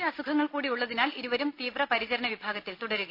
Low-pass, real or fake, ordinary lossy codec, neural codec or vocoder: 5.4 kHz; real; none; none